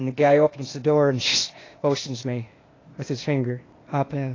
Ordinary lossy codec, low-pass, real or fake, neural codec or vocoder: AAC, 32 kbps; 7.2 kHz; fake; codec, 16 kHz, 0.8 kbps, ZipCodec